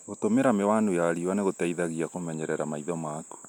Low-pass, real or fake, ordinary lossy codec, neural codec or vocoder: 19.8 kHz; real; none; none